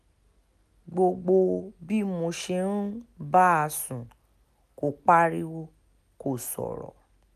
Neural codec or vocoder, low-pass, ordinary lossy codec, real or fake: none; 14.4 kHz; none; real